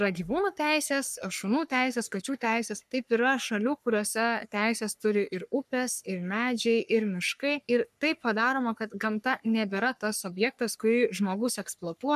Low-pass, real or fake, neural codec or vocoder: 14.4 kHz; fake; codec, 44.1 kHz, 3.4 kbps, Pupu-Codec